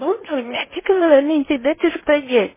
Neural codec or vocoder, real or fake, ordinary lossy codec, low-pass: codec, 16 kHz in and 24 kHz out, 0.8 kbps, FocalCodec, streaming, 65536 codes; fake; MP3, 16 kbps; 3.6 kHz